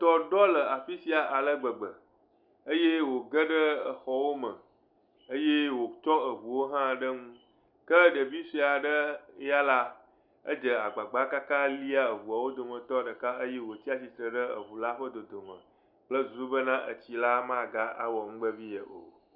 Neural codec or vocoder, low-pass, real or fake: none; 5.4 kHz; real